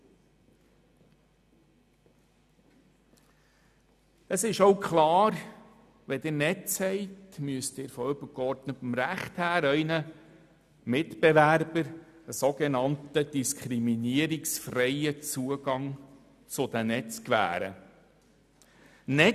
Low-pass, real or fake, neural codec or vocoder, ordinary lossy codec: 14.4 kHz; real; none; none